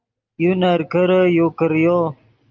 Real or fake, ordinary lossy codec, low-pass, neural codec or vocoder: real; Opus, 32 kbps; 7.2 kHz; none